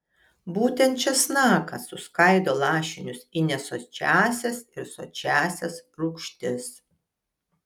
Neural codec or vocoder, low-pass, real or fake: none; 19.8 kHz; real